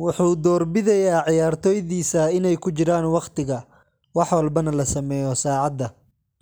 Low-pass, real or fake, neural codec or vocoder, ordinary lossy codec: none; real; none; none